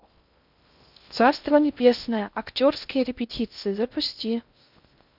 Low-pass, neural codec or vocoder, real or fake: 5.4 kHz; codec, 16 kHz in and 24 kHz out, 0.6 kbps, FocalCodec, streaming, 2048 codes; fake